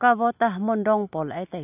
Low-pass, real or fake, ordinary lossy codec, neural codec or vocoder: 3.6 kHz; real; none; none